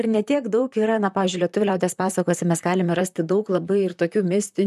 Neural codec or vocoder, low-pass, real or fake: vocoder, 44.1 kHz, 128 mel bands, Pupu-Vocoder; 14.4 kHz; fake